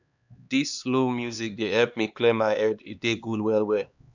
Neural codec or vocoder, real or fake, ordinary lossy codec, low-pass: codec, 16 kHz, 2 kbps, X-Codec, HuBERT features, trained on LibriSpeech; fake; none; 7.2 kHz